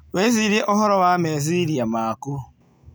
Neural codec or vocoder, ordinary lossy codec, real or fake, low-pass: none; none; real; none